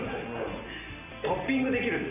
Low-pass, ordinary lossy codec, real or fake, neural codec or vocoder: 3.6 kHz; none; real; none